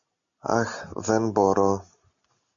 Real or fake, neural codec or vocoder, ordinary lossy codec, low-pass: real; none; MP3, 96 kbps; 7.2 kHz